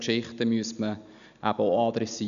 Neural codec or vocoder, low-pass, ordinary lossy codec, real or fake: none; 7.2 kHz; none; real